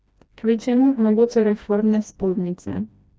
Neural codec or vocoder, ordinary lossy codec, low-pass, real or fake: codec, 16 kHz, 1 kbps, FreqCodec, smaller model; none; none; fake